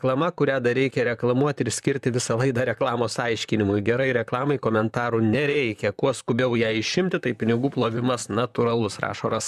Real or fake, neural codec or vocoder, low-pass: fake; vocoder, 44.1 kHz, 128 mel bands, Pupu-Vocoder; 14.4 kHz